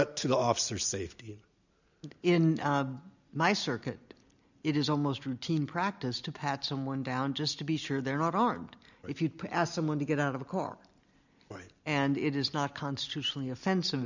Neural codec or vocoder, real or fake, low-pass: none; real; 7.2 kHz